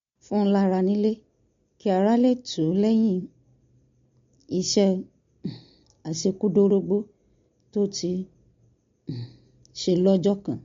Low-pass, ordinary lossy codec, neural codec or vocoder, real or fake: 7.2 kHz; MP3, 48 kbps; none; real